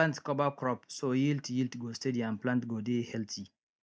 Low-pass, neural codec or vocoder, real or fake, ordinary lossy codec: none; none; real; none